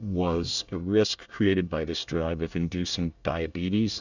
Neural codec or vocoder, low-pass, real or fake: codec, 24 kHz, 1 kbps, SNAC; 7.2 kHz; fake